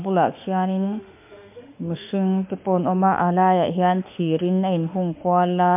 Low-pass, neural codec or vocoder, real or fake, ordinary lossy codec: 3.6 kHz; autoencoder, 48 kHz, 32 numbers a frame, DAC-VAE, trained on Japanese speech; fake; MP3, 32 kbps